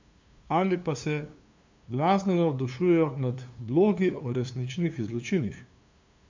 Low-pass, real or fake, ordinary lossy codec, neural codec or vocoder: 7.2 kHz; fake; MP3, 64 kbps; codec, 16 kHz, 2 kbps, FunCodec, trained on LibriTTS, 25 frames a second